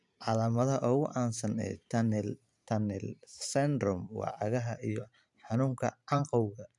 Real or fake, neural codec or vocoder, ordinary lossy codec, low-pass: fake; vocoder, 44.1 kHz, 128 mel bands every 512 samples, BigVGAN v2; none; 10.8 kHz